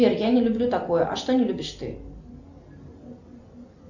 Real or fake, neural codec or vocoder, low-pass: real; none; 7.2 kHz